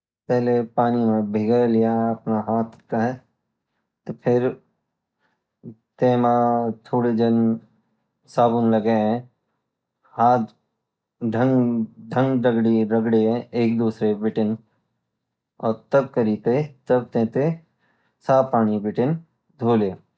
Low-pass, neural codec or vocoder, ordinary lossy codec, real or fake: none; none; none; real